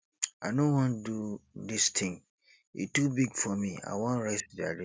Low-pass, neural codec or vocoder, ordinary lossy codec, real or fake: none; none; none; real